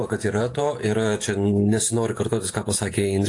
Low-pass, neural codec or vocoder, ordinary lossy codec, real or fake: 10.8 kHz; vocoder, 48 kHz, 128 mel bands, Vocos; AAC, 48 kbps; fake